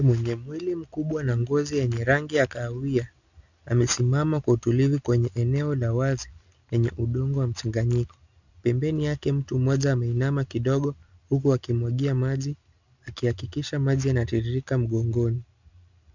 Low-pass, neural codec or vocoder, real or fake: 7.2 kHz; none; real